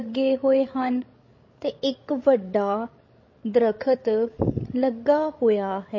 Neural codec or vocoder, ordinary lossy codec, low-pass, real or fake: codec, 16 kHz, 16 kbps, FreqCodec, larger model; MP3, 32 kbps; 7.2 kHz; fake